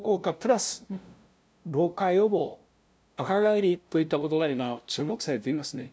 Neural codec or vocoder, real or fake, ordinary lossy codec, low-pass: codec, 16 kHz, 0.5 kbps, FunCodec, trained on LibriTTS, 25 frames a second; fake; none; none